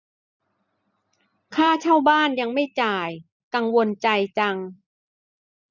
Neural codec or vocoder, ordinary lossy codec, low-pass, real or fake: none; none; 7.2 kHz; real